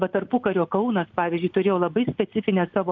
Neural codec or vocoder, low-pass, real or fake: none; 7.2 kHz; real